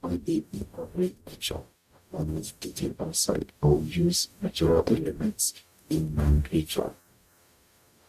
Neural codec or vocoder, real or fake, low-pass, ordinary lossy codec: codec, 44.1 kHz, 0.9 kbps, DAC; fake; 14.4 kHz; none